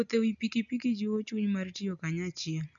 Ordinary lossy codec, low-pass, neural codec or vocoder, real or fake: MP3, 96 kbps; 7.2 kHz; none; real